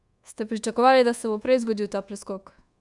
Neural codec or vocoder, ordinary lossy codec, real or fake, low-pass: codec, 24 kHz, 1.2 kbps, DualCodec; Opus, 64 kbps; fake; 10.8 kHz